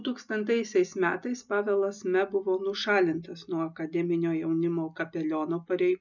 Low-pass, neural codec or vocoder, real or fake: 7.2 kHz; none; real